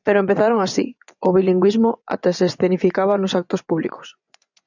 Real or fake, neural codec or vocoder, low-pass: fake; vocoder, 24 kHz, 100 mel bands, Vocos; 7.2 kHz